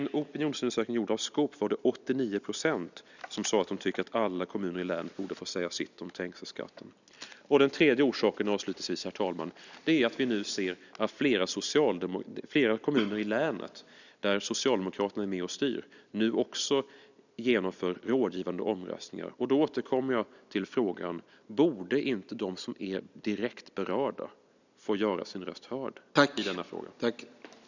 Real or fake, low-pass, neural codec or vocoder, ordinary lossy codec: real; 7.2 kHz; none; none